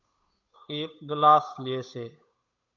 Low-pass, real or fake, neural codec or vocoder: 7.2 kHz; fake; codec, 16 kHz, 8 kbps, FunCodec, trained on Chinese and English, 25 frames a second